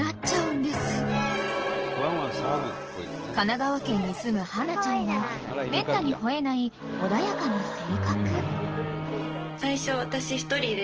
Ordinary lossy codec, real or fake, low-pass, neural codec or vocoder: Opus, 16 kbps; real; 7.2 kHz; none